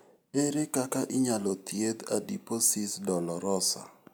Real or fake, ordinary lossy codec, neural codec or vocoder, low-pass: real; none; none; none